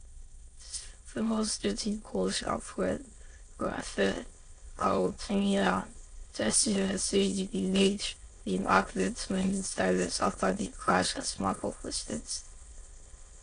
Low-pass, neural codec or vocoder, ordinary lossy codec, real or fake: 9.9 kHz; autoencoder, 22.05 kHz, a latent of 192 numbers a frame, VITS, trained on many speakers; AAC, 48 kbps; fake